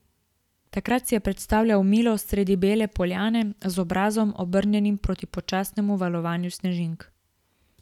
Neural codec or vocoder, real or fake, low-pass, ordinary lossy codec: none; real; 19.8 kHz; none